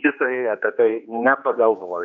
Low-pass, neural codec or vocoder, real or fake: 7.2 kHz; codec, 16 kHz, 2 kbps, X-Codec, HuBERT features, trained on general audio; fake